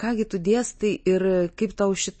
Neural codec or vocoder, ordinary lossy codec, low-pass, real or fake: none; MP3, 32 kbps; 9.9 kHz; real